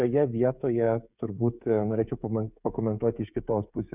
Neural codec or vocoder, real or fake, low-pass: codec, 16 kHz, 16 kbps, FreqCodec, smaller model; fake; 3.6 kHz